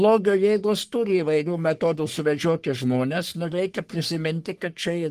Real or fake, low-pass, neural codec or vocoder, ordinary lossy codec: fake; 14.4 kHz; codec, 44.1 kHz, 3.4 kbps, Pupu-Codec; Opus, 24 kbps